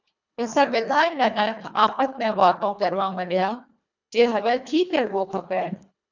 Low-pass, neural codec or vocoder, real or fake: 7.2 kHz; codec, 24 kHz, 1.5 kbps, HILCodec; fake